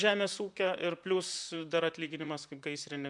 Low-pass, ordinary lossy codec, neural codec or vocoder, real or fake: 10.8 kHz; MP3, 96 kbps; vocoder, 44.1 kHz, 128 mel bands, Pupu-Vocoder; fake